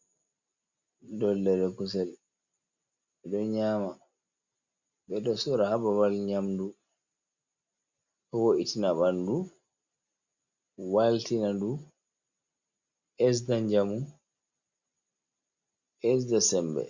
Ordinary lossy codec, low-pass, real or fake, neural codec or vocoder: Opus, 64 kbps; 7.2 kHz; real; none